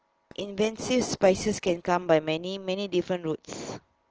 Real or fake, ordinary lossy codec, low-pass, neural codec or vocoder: real; Opus, 16 kbps; 7.2 kHz; none